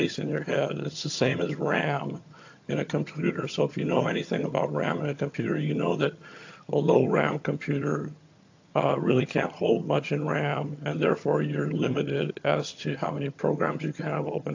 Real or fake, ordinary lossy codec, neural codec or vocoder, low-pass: fake; AAC, 48 kbps; vocoder, 22.05 kHz, 80 mel bands, HiFi-GAN; 7.2 kHz